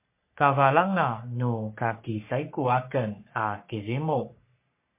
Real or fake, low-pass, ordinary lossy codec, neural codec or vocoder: fake; 3.6 kHz; MP3, 24 kbps; codec, 44.1 kHz, 3.4 kbps, Pupu-Codec